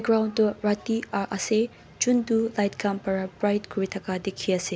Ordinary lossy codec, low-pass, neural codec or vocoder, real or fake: none; none; none; real